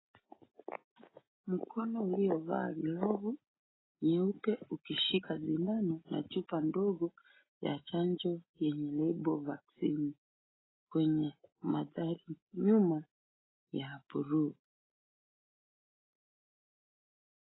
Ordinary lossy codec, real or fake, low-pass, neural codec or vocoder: AAC, 16 kbps; real; 7.2 kHz; none